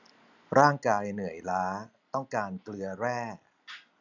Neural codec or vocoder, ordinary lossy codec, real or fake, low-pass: none; none; real; 7.2 kHz